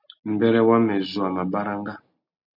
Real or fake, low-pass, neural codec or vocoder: real; 5.4 kHz; none